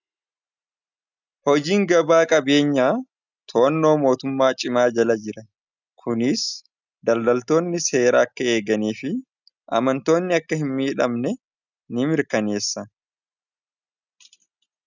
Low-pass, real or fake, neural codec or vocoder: 7.2 kHz; real; none